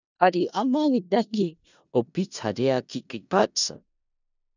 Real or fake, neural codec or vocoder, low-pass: fake; codec, 16 kHz in and 24 kHz out, 0.4 kbps, LongCat-Audio-Codec, four codebook decoder; 7.2 kHz